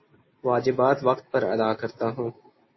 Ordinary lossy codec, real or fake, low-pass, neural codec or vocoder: MP3, 24 kbps; real; 7.2 kHz; none